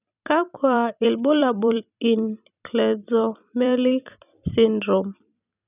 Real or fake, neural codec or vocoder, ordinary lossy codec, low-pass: fake; vocoder, 44.1 kHz, 128 mel bands every 256 samples, BigVGAN v2; none; 3.6 kHz